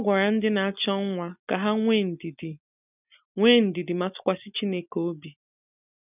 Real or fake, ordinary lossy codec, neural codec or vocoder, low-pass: real; none; none; 3.6 kHz